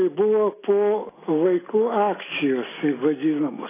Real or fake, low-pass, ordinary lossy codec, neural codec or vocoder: real; 3.6 kHz; AAC, 16 kbps; none